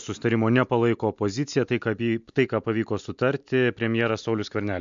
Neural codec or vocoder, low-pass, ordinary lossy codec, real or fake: none; 7.2 kHz; MP3, 64 kbps; real